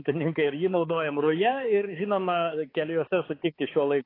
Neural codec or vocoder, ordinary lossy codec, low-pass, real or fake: codec, 16 kHz, 4 kbps, X-Codec, HuBERT features, trained on balanced general audio; AAC, 24 kbps; 5.4 kHz; fake